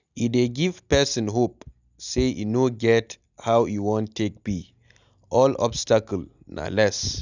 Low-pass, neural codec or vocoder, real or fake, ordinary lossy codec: 7.2 kHz; none; real; none